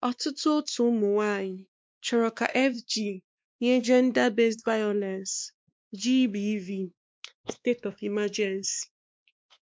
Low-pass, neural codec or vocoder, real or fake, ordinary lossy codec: none; codec, 16 kHz, 2 kbps, X-Codec, WavLM features, trained on Multilingual LibriSpeech; fake; none